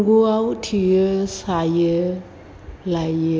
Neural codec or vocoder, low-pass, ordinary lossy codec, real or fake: none; none; none; real